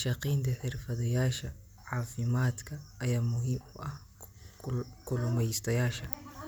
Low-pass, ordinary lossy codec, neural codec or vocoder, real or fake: none; none; none; real